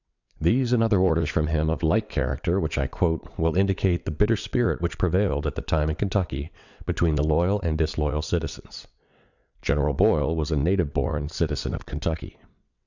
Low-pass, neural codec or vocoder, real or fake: 7.2 kHz; vocoder, 22.05 kHz, 80 mel bands, WaveNeXt; fake